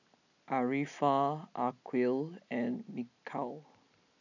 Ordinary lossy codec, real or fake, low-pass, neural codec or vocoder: none; real; 7.2 kHz; none